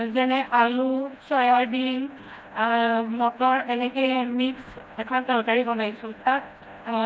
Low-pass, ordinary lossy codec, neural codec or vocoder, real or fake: none; none; codec, 16 kHz, 1 kbps, FreqCodec, smaller model; fake